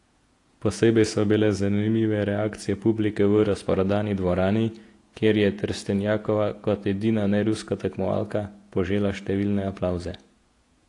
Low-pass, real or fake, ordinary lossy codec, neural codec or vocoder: 10.8 kHz; fake; AAC, 48 kbps; vocoder, 48 kHz, 128 mel bands, Vocos